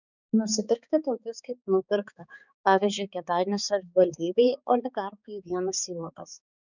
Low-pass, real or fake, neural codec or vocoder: 7.2 kHz; fake; codec, 16 kHz, 4 kbps, X-Codec, HuBERT features, trained on balanced general audio